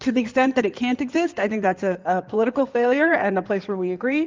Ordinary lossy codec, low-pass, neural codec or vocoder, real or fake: Opus, 24 kbps; 7.2 kHz; codec, 16 kHz in and 24 kHz out, 2.2 kbps, FireRedTTS-2 codec; fake